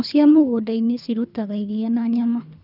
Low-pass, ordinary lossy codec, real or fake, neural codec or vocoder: 5.4 kHz; none; fake; codec, 24 kHz, 3 kbps, HILCodec